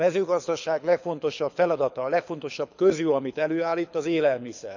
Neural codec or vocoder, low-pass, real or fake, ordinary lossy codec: codec, 24 kHz, 6 kbps, HILCodec; 7.2 kHz; fake; none